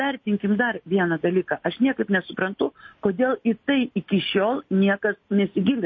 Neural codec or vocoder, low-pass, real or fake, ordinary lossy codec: none; 7.2 kHz; real; MP3, 32 kbps